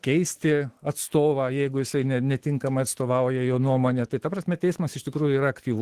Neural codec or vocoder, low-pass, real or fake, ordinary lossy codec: autoencoder, 48 kHz, 128 numbers a frame, DAC-VAE, trained on Japanese speech; 14.4 kHz; fake; Opus, 16 kbps